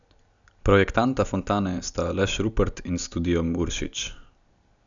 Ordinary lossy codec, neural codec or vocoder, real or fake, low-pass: none; none; real; 7.2 kHz